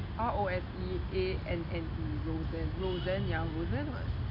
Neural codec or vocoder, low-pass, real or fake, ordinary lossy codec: none; 5.4 kHz; real; MP3, 32 kbps